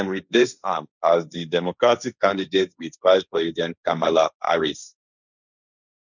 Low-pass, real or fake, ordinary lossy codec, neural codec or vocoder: 7.2 kHz; fake; none; codec, 16 kHz, 1.1 kbps, Voila-Tokenizer